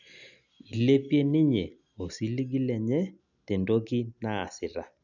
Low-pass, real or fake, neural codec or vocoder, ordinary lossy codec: 7.2 kHz; real; none; none